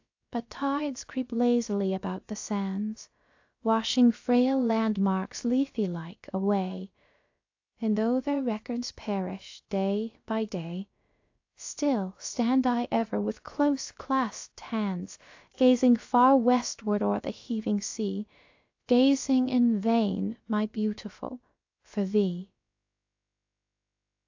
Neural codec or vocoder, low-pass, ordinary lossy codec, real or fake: codec, 16 kHz, about 1 kbps, DyCAST, with the encoder's durations; 7.2 kHz; AAC, 48 kbps; fake